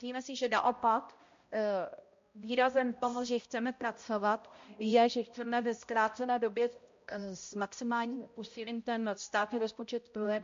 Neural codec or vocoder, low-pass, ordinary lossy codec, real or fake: codec, 16 kHz, 0.5 kbps, X-Codec, HuBERT features, trained on balanced general audio; 7.2 kHz; MP3, 48 kbps; fake